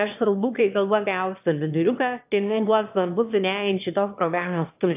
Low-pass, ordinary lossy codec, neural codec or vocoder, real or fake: 3.6 kHz; AAC, 32 kbps; autoencoder, 22.05 kHz, a latent of 192 numbers a frame, VITS, trained on one speaker; fake